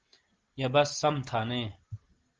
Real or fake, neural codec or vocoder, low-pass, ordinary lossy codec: real; none; 7.2 kHz; Opus, 16 kbps